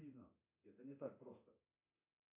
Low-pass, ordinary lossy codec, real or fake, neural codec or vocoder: 3.6 kHz; MP3, 24 kbps; fake; codec, 24 kHz, 0.9 kbps, DualCodec